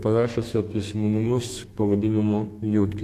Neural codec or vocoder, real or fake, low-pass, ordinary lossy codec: codec, 32 kHz, 1.9 kbps, SNAC; fake; 14.4 kHz; AAC, 64 kbps